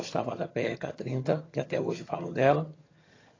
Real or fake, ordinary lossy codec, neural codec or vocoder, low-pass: fake; AAC, 32 kbps; vocoder, 22.05 kHz, 80 mel bands, HiFi-GAN; 7.2 kHz